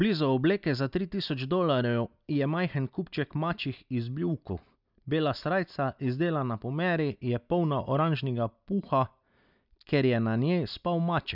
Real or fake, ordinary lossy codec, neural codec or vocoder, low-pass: real; none; none; 5.4 kHz